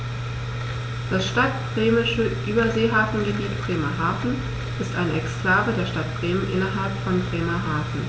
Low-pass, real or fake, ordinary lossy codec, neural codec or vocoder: none; real; none; none